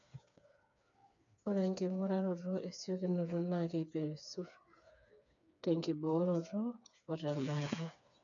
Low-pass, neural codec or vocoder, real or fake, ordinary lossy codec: 7.2 kHz; codec, 16 kHz, 4 kbps, FreqCodec, smaller model; fake; none